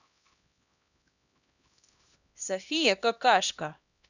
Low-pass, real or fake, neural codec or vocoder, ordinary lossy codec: 7.2 kHz; fake; codec, 16 kHz, 1 kbps, X-Codec, HuBERT features, trained on LibriSpeech; none